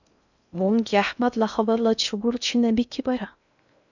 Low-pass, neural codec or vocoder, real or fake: 7.2 kHz; codec, 16 kHz in and 24 kHz out, 0.6 kbps, FocalCodec, streaming, 4096 codes; fake